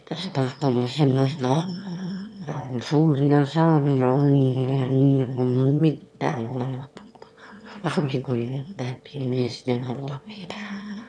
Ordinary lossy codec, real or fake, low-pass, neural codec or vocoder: none; fake; none; autoencoder, 22.05 kHz, a latent of 192 numbers a frame, VITS, trained on one speaker